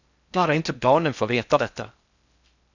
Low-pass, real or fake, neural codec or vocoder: 7.2 kHz; fake; codec, 16 kHz in and 24 kHz out, 0.6 kbps, FocalCodec, streaming, 4096 codes